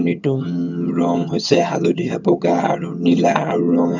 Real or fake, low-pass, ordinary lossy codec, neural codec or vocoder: fake; 7.2 kHz; none; vocoder, 22.05 kHz, 80 mel bands, HiFi-GAN